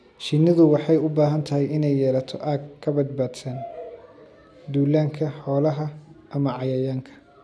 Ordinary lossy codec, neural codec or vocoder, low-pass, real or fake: none; none; none; real